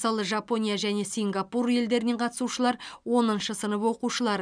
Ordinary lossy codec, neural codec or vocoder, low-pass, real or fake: none; none; 9.9 kHz; real